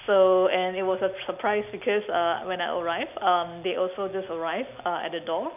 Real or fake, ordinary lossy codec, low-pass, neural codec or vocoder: real; none; 3.6 kHz; none